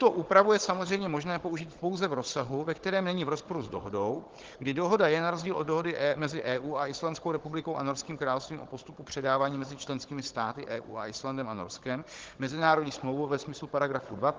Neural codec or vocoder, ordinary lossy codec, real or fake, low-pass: codec, 16 kHz, 16 kbps, FunCodec, trained on Chinese and English, 50 frames a second; Opus, 16 kbps; fake; 7.2 kHz